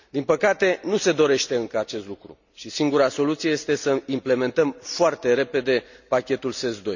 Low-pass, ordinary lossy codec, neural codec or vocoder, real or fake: 7.2 kHz; none; none; real